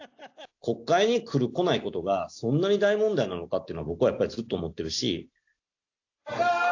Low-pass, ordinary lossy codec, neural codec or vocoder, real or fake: 7.2 kHz; AAC, 48 kbps; none; real